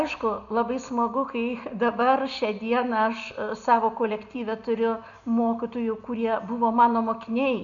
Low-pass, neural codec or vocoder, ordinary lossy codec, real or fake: 7.2 kHz; none; MP3, 96 kbps; real